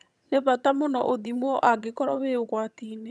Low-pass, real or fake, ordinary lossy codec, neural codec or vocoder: none; fake; none; vocoder, 22.05 kHz, 80 mel bands, HiFi-GAN